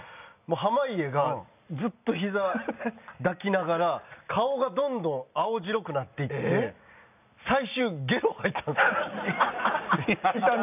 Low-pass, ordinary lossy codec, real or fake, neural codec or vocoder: 3.6 kHz; none; real; none